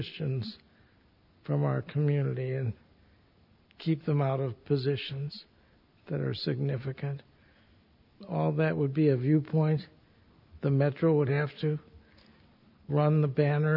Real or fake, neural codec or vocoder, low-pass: real; none; 5.4 kHz